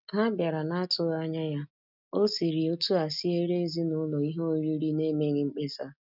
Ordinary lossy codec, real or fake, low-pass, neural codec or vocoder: none; real; 5.4 kHz; none